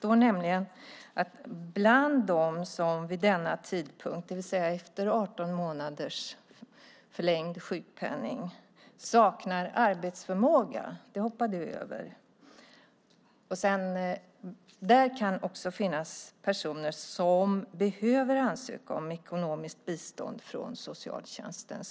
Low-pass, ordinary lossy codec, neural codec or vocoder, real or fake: none; none; none; real